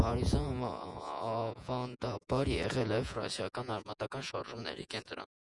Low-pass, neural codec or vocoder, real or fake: 10.8 kHz; vocoder, 48 kHz, 128 mel bands, Vocos; fake